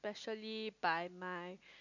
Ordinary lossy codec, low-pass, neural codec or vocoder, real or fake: none; 7.2 kHz; none; real